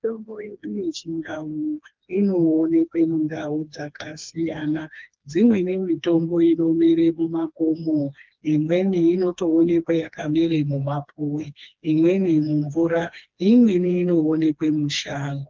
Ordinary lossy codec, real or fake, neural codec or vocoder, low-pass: Opus, 32 kbps; fake; codec, 16 kHz, 2 kbps, FreqCodec, smaller model; 7.2 kHz